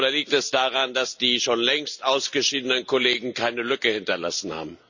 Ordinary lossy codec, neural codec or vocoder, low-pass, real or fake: none; none; 7.2 kHz; real